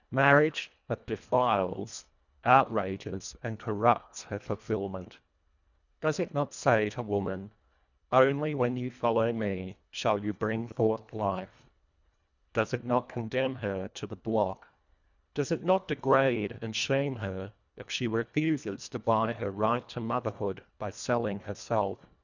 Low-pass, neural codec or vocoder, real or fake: 7.2 kHz; codec, 24 kHz, 1.5 kbps, HILCodec; fake